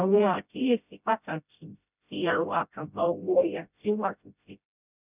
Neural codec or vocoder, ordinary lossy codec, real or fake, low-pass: codec, 16 kHz, 0.5 kbps, FreqCodec, smaller model; none; fake; 3.6 kHz